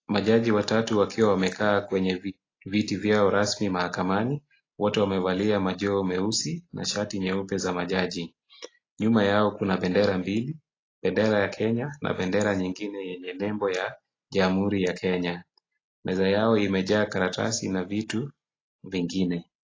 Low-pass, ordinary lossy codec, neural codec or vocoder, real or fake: 7.2 kHz; AAC, 32 kbps; none; real